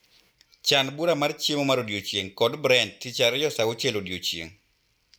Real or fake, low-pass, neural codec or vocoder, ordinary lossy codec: real; none; none; none